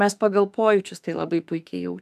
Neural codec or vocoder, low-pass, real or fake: autoencoder, 48 kHz, 32 numbers a frame, DAC-VAE, trained on Japanese speech; 14.4 kHz; fake